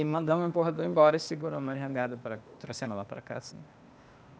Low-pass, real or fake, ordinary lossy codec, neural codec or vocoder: none; fake; none; codec, 16 kHz, 0.8 kbps, ZipCodec